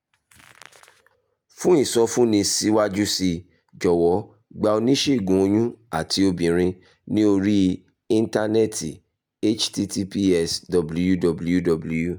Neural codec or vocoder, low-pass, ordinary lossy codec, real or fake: none; none; none; real